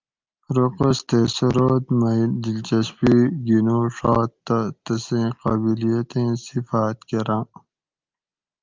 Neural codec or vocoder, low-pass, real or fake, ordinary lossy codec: none; 7.2 kHz; real; Opus, 32 kbps